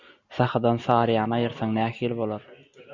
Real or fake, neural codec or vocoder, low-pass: real; none; 7.2 kHz